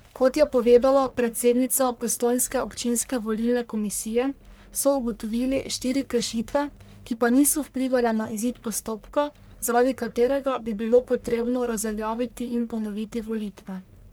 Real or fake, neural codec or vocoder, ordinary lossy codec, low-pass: fake; codec, 44.1 kHz, 1.7 kbps, Pupu-Codec; none; none